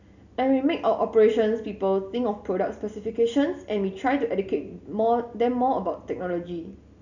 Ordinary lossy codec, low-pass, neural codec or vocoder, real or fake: none; 7.2 kHz; none; real